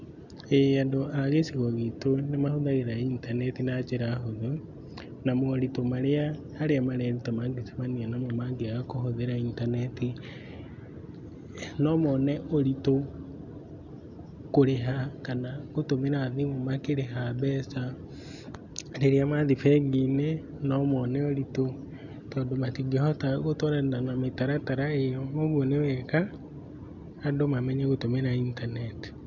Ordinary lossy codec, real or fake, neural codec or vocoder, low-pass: none; real; none; 7.2 kHz